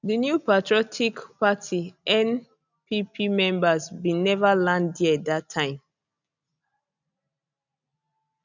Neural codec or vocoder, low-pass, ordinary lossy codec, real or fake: none; 7.2 kHz; none; real